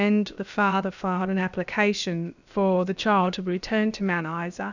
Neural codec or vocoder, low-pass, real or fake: codec, 16 kHz, about 1 kbps, DyCAST, with the encoder's durations; 7.2 kHz; fake